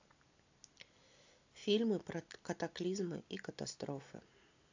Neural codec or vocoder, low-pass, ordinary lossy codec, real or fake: none; 7.2 kHz; AAC, 48 kbps; real